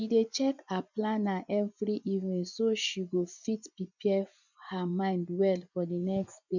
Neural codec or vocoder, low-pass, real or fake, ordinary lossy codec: vocoder, 24 kHz, 100 mel bands, Vocos; 7.2 kHz; fake; none